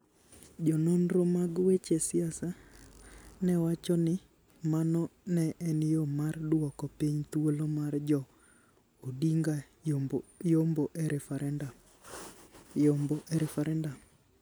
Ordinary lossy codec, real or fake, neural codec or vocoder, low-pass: none; real; none; none